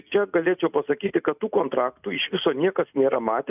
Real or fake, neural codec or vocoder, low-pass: real; none; 3.6 kHz